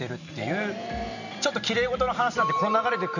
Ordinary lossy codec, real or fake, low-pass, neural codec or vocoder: none; fake; 7.2 kHz; vocoder, 44.1 kHz, 128 mel bands every 512 samples, BigVGAN v2